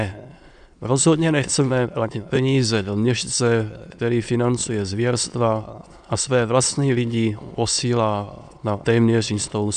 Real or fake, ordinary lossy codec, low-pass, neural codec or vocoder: fake; AAC, 96 kbps; 9.9 kHz; autoencoder, 22.05 kHz, a latent of 192 numbers a frame, VITS, trained on many speakers